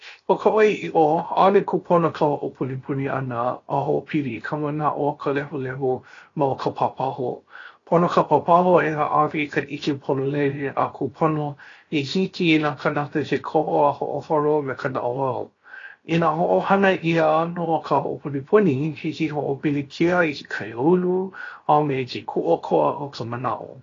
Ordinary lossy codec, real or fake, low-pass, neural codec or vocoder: AAC, 32 kbps; fake; 7.2 kHz; codec, 16 kHz, 0.7 kbps, FocalCodec